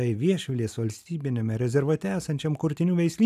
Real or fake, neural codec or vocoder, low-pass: real; none; 14.4 kHz